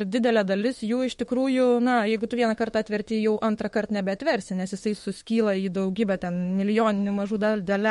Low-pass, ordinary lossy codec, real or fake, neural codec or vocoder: 19.8 kHz; MP3, 48 kbps; fake; autoencoder, 48 kHz, 32 numbers a frame, DAC-VAE, trained on Japanese speech